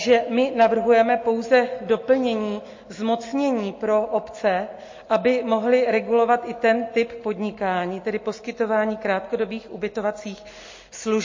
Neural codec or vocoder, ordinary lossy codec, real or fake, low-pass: none; MP3, 32 kbps; real; 7.2 kHz